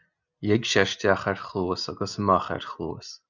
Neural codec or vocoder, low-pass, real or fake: none; 7.2 kHz; real